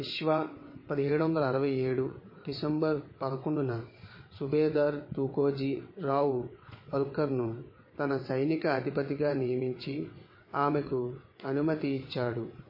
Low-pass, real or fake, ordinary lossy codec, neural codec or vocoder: 5.4 kHz; fake; MP3, 24 kbps; vocoder, 22.05 kHz, 80 mel bands, WaveNeXt